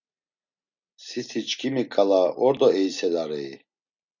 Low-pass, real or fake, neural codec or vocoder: 7.2 kHz; real; none